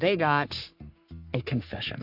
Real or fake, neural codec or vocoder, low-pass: fake; codec, 44.1 kHz, 3.4 kbps, Pupu-Codec; 5.4 kHz